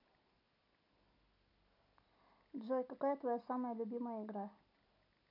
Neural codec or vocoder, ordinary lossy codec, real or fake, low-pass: none; none; real; 5.4 kHz